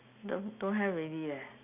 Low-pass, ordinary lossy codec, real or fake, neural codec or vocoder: 3.6 kHz; none; real; none